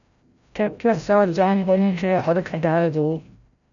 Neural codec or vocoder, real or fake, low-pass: codec, 16 kHz, 0.5 kbps, FreqCodec, larger model; fake; 7.2 kHz